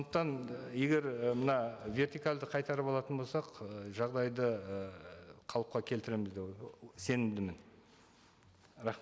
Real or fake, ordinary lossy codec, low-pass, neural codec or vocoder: real; none; none; none